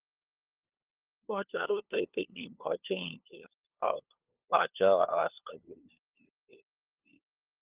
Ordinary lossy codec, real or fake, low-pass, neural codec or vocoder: Opus, 32 kbps; fake; 3.6 kHz; codec, 16 kHz, 2 kbps, FunCodec, trained on LibriTTS, 25 frames a second